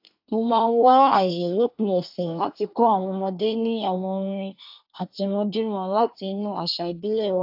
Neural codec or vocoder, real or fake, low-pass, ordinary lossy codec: codec, 24 kHz, 1 kbps, SNAC; fake; 5.4 kHz; AAC, 48 kbps